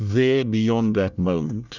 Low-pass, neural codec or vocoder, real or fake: 7.2 kHz; codec, 24 kHz, 1 kbps, SNAC; fake